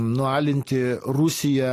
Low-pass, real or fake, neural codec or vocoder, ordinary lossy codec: 14.4 kHz; real; none; AAC, 48 kbps